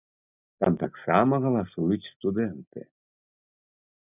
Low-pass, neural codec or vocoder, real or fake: 3.6 kHz; none; real